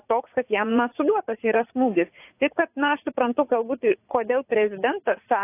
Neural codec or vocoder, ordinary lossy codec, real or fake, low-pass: vocoder, 44.1 kHz, 80 mel bands, Vocos; AAC, 24 kbps; fake; 3.6 kHz